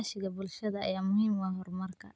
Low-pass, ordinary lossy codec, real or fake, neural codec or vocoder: none; none; real; none